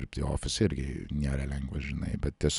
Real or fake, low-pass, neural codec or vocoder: real; 10.8 kHz; none